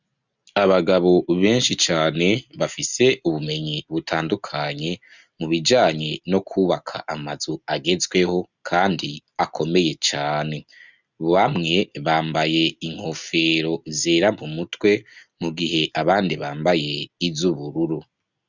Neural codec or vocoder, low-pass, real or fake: none; 7.2 kHz; real